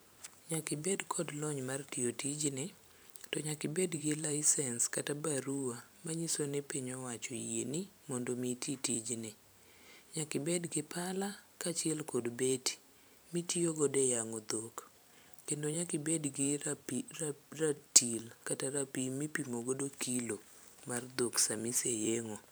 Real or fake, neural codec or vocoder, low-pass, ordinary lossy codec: fake; vocoder, 44.1 kHz, 128 mel bands every 512 samples, BigVGAN v2; none; none